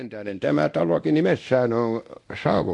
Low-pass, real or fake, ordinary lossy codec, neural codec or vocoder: 10.8 kHz; fake; AAC, 64 kbps; codec, 24 kHz, 0.9 kbps, DualCodec